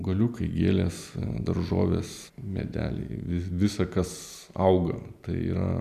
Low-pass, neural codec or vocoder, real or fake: 14.4 kHz; none; real